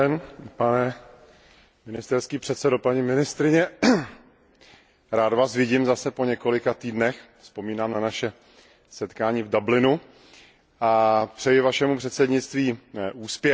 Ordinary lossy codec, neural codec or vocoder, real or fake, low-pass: none; none; real; none